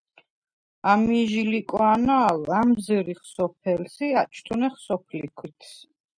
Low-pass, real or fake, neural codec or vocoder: 9.9 kHz; real; none